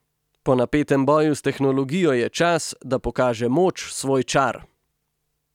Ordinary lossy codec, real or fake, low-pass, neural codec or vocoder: none; real; 19.8 kHz; none